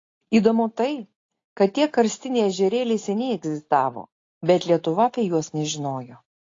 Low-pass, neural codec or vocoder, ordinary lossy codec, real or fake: 7.2 kHz; none; AAC, 32 kbps; real